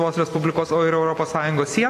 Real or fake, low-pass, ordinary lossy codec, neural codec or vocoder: fake; 14.4 kHz; AAC, 48 kbps; vocoder, 44.1 kHz, 128 mel bands every 256 samples, BigVGAN v2